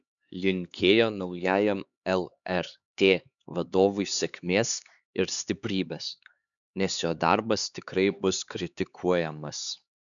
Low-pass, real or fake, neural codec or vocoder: 7.2 kHz; fake; codec, 16 kHz, 4 kbps, X-Codec, HuBERT features, trained on LibriSpeech